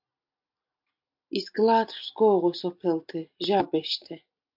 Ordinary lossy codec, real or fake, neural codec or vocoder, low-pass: MP3, 48 kbps; real; none; 5.4 kHz